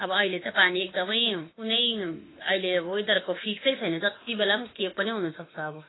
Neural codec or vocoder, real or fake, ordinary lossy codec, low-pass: autoencoder, 48 kHz, 32 numbers a frame, DAC-VAE, trained on Japanese speech; fake; AAC, 16 kbps; 7.2 kHz